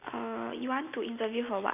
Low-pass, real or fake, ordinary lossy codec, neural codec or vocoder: 3.6 kHz; real; none; none